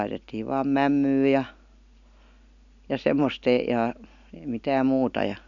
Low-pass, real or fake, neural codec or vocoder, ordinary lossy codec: 7.2 kHz; real; none; none